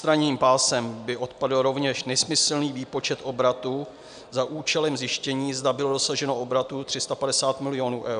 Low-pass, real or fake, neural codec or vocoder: 9.9 kHz; real; none